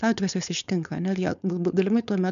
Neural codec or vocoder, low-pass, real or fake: codec, 16 kHz, 4.8 kbps, FACodec; 7.2 kHz; fake